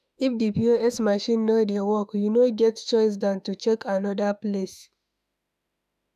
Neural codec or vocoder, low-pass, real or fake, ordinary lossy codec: autoencoder, 48 kHz, 32 numbers a frame, DAC-VAE, trained on Japanese speech; 14.4 kHz; fake; none